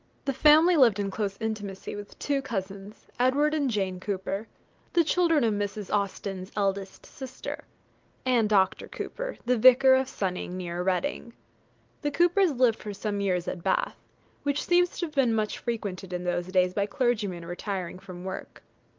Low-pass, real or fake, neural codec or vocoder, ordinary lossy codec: 7.2 kHz; real; none; Opus, 24 kbps